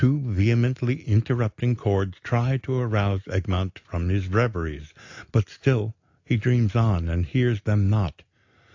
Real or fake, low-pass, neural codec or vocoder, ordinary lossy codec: real; 7.2 kHz; none; AAC, 48 kbps